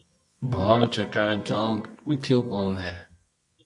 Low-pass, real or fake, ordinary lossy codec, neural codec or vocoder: 10.8 kHz; fake; MP3, 48 kbps; codec, 24 kHz, 0.9 kbps, WavTokenizer, medium music audio release